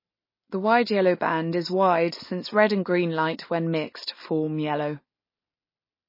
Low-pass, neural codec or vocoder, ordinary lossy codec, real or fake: 5.4 kHz; none; MP3, 24 kbps; real